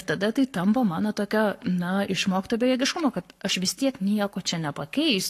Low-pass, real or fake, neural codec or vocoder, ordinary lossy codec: 14.4 kHz; fake; codec, 44.1 kHz, 7.8 kbps, Pupu-Codec; AAC, 48 kbps